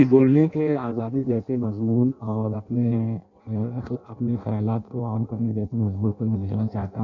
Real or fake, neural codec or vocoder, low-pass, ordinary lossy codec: fake; codec, 16 kHz in and 24 kHz out, 0.6 kbps, FireRedTTS-2 codec; 7.2 kHz; none